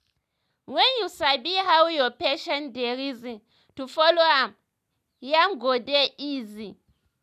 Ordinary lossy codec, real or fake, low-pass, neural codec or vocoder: none; real; 14.4 kHz; none